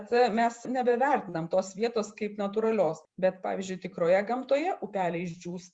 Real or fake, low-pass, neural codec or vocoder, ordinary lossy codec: fake; 9.9 kHz; vocoder, 48 kHz, 128 mel bands, Vocos; Opus, 64 kbps